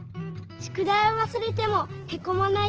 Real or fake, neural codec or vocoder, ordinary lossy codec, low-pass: real; none; Opus, 16 kbps; 7.2 kHz